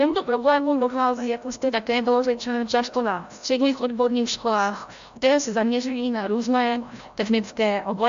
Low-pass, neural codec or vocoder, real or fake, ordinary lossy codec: 7.2 kHz; codec, 16 kHz, 0.5 kbps, FreqCodec, larger model; fake; AAC, 64 kbps